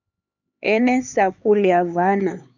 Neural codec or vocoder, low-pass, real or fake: codec, 16 kHz, 4 kbps, X-Codec, HuBERT features, trained on LibriSpeech; 7.2 kHz; fake